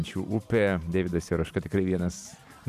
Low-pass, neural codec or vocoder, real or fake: 14.4 kHz; vocoder, 44.1 kHz, 128 mel bands every 512 samples, BigVGAN v2; fake